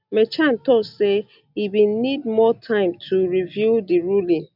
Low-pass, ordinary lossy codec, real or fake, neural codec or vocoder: 5.4 kHz; none; real; none